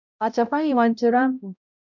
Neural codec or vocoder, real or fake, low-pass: codec, 16 kHz, 0.5 kbps, X-Codec, HuBERT features, trained on balanced general audio; fake; 7.2 kHz